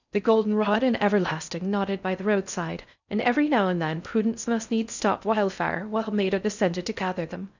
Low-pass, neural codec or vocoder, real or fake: 7.2 kHz; codec, 16 kHz in and 24 kHz out, 0.6 kbps, FocalCodec, streaming, 4096 codes; fake